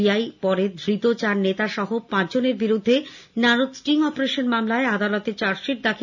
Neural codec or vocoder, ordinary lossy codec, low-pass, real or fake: none; none; 7.2 kHz; real